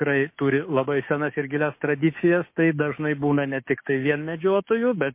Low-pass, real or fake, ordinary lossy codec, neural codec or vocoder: 3.6 kHz; fake; MP3, 24 kbps; codec, 16 kHz, 6 kbps, DAC